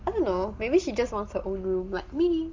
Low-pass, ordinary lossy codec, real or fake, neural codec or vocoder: 7.2 kHz; Opus, 32 kbps; real; none